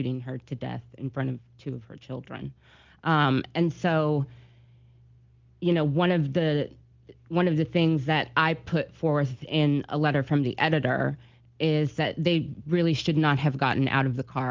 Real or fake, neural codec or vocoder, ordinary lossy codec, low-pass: real; none; Opus, 24 kbps; 7.2 kHz